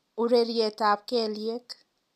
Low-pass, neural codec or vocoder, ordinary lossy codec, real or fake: 14.4 kHz; none; MP3, 96 kbps; real